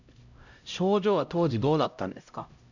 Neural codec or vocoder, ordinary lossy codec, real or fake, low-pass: codec, 16 kHz, 0.5 kbps, X-Codec, HuBERT features, trained on LibriSpeech; none; fake; 7.2 kHz